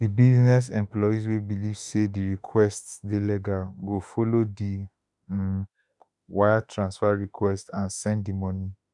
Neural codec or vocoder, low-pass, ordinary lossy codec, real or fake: autoencoder, 48 kHz, 32 numbers a frame, DAC-VAE, trained on Japanese speech; 10.8 kHz; none; fake